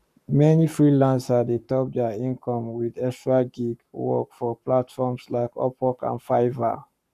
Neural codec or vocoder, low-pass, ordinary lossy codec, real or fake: codec, 44.1 kHz, 7.8 kbps, Pupu-Codec; 14.4 kHz; none; fake